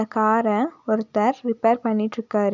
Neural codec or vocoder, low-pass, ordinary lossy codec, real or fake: none; 7.2 kHz; none; real